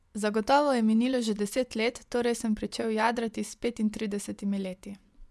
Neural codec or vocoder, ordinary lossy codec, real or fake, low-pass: vocoder, 24 kHz, 100 mel bands, Vocos; none; fake; none